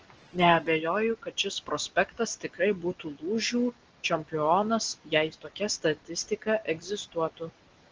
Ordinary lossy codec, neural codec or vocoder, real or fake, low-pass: Opus, 16 kbps; none; real; 7.2 kHz